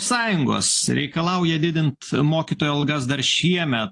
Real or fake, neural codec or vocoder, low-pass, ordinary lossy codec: real; none; 10.8 kHz; AAC, 48 kbps